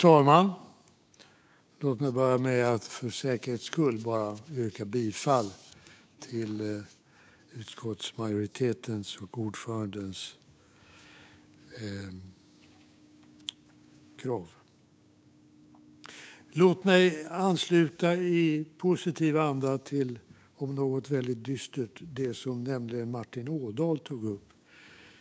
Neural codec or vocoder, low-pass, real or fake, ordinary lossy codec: codec, 16 kHz, 6 kbps, DAC; none; fake; none